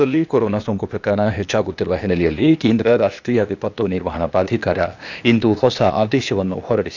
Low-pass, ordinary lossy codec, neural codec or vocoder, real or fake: 7.2 kHz; none; codec, 16 kHz, 0.8 kbps, ZipCodec; fake